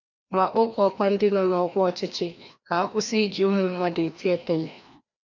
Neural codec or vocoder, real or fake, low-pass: codec, 16 kHz, 1 kbps, FreqCodec, larger model; fake; 7.2 kHz